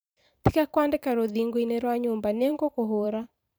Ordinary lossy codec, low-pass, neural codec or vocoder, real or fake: none; none; vocoder, 44.1 kHz, 128 mel bands every 512 samples, BigVGAN v2; fake